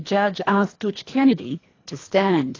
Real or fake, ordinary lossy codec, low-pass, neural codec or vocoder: fake; AAC, 32 kbps; 7.2 kHz; codec, 24 kHz, 1.5 kbps, HILCodec